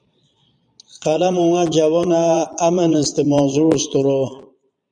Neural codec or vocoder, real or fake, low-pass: vocoder, 22.05 kHz, 80 mel bands, Vocos; fake; 9.9 kHz